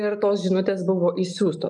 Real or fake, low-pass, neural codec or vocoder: real; 10.8 kHz; none